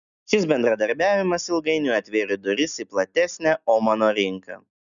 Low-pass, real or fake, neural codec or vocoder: 7.2 kHz; real; none